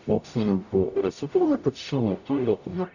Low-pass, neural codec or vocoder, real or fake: 7.2 kHz; codec, 44.1 kHz, 0.9 kbps, DAC; fake